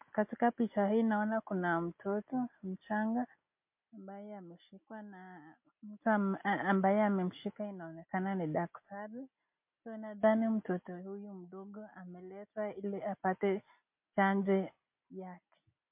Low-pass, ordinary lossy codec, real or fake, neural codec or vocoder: 3.6 kHz; MP3, 32 kbps; real; none